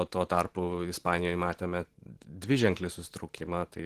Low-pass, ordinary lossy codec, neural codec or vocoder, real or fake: 14.4 kHz; Opus, 16 kbps; vocoder, 44.1 kHz, 128 mel bands every 512 samples, BigVGAN v2; fake